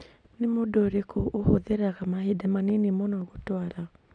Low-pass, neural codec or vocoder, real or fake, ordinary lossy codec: 9.9 kHz; none; real; none